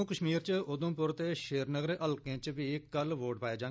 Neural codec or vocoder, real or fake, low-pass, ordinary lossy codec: none; real; none; none